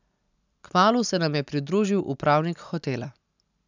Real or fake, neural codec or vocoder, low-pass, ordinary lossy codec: real; none; 7.2 kHz; none